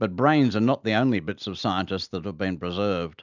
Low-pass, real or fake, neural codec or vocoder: 7.2 kHz; real; none